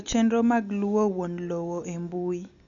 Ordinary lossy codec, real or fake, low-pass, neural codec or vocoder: none; real; 7.2 kHz; none